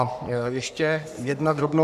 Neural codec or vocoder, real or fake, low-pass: codec, 44.1 kHz, 3.4 kbps, Pupu-Codec; fake; 14.4 kHz